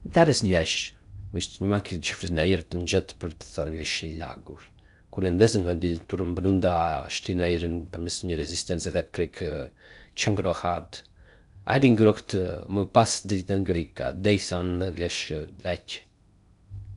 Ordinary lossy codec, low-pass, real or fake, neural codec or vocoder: none; 10.8 kHz; fake; codec, 16 kHz in and 24 kHz out, 0.6 kbps, FocalCodec, streaming, 4096 codes